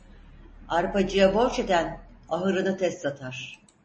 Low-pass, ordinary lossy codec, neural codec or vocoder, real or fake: 10.8 kHz; MP3, 32 kbps; none; real